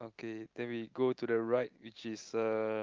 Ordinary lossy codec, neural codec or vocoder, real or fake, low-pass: Opus, 32 kbps; none; real; 7.2 kHz